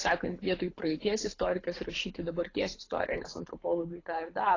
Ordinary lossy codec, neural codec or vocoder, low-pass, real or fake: AAC, 32 kbps; codec, 24 kHz, 6 kbps, HILCodec; 7.2 kHz; fake